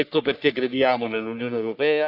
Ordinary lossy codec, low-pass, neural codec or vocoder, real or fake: none; 5.4 kHz; codec, 44.1 kHz, 3.4 kbps, Pupu-Codec; fake